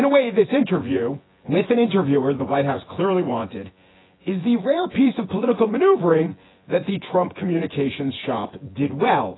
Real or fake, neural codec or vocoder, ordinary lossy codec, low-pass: fake; vocoder, 24 kHz, 100 mel bands, Vocos; AAC, 16 kbps; 7.2 kHz